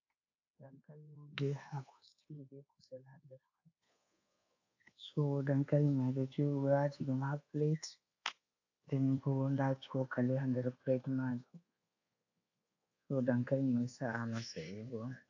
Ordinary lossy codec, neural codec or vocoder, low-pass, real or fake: AAC, 48 kbps; codec, 24 kHz, 1.2 kbps, DualCodec; 7.2 kHz; fake